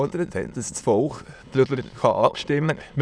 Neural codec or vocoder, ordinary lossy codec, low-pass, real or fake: autoencoder, 22.05 kHz, a latent of 192 numbers a frame, VITS, trained on many speakers; none; none; fake